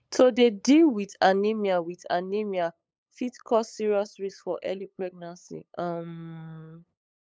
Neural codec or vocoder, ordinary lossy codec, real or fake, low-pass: codec, 16 kHz, 8 kbps, FunCodec, trained on LibriTTS, 25 frames a second; none; fake; none